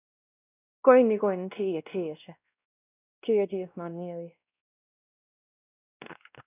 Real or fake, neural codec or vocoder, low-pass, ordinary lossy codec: fake; codec, 16 kHz, 1 kbps, X-Codec, WavLM features, trained on Multilingual LibriSpeech; 3.6 kHz; none